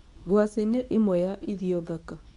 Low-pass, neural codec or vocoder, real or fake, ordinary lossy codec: 10.8 kHz; codec, 24 kHz, 0.9 kbps, WavTokenizer, medium speech release version 2; fake; none